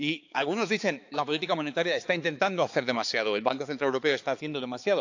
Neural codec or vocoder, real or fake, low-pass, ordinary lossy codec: codec, 16 kHz, 4 kbps, X-Codec, HuBERT features, trained on balanced general audio; fake; 7.2 kHz; AAC, 48 kbps